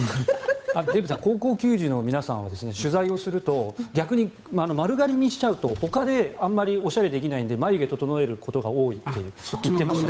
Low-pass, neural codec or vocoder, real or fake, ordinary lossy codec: none; codec, 16 kHz, 8 kbps, FunCodec, trained on Chinese and English, 25 frames a second; fake; none